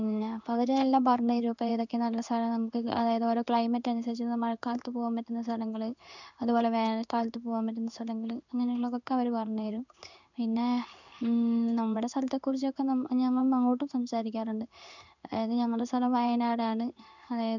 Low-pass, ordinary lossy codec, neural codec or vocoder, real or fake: 7.2 kHz; none; codec, 16 kHz in and 24 kHz out, 1 kbps, XY-Tokenizer; fake